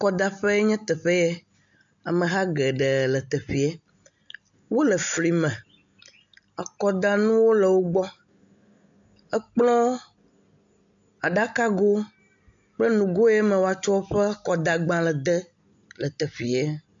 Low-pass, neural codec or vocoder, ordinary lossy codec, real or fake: 7.2 kHz; none; AAC, 64 kbps; real